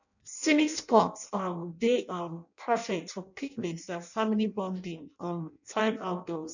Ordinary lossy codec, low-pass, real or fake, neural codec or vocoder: none; 7.2 kHz; fake; codec, 16 kHz in and 24 kHz out, 0.6 kbps, FireRedTTS-2 codec